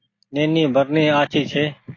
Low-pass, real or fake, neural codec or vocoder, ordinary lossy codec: 7.2 kHz; real; none; AAC, 32 kbps